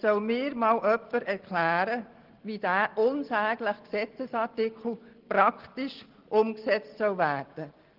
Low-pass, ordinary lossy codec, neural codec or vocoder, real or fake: 5.4 kHz; Opus, 16 kbps; none; real